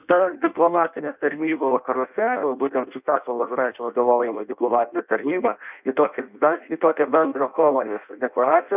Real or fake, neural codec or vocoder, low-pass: fake; codec, 16 kHz in and 24 kHz out, 0.6 kbps, FireRedTTS-2 codec; 3.6 kHz